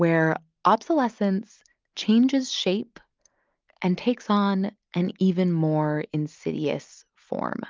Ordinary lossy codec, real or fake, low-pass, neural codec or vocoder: Opus, 24 kbps; real; 7.2 kHz; none